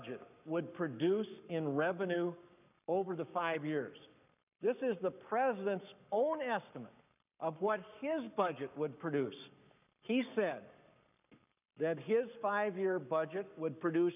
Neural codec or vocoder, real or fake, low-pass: codec, 44.1 kHz, 7.8 kbps, Pupu-Codec; fake; 3.6 kHz